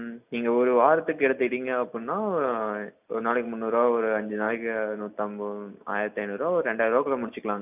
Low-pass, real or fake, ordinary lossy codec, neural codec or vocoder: 3.6 kHz; real; none; none